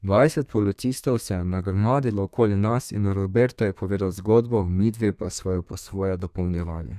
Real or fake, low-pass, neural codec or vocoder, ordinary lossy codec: fake; 14.4 kHz; codec, 32 kHz, 1.9 kbps, SNAC; none